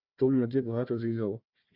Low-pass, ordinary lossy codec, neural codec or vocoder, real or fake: 5.4 kHz; Opus, 64 kbps; codec, 16 kHz, 1 kbps, FunCodec, trained on Chinese and English, 50 frames a second; fake